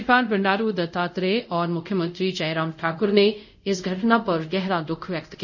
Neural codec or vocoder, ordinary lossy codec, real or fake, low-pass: codec, 24 kHz, 0.5 kbps, DualCodec; none; fake; 7.2 kHz